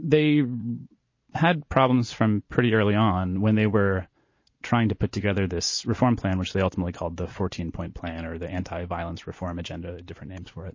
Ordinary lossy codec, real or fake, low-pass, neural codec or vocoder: MP3, 32 kbps; real; 7.2 kHz; none